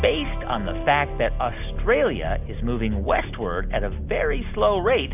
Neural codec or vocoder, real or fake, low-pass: none; real; 3.6 kHz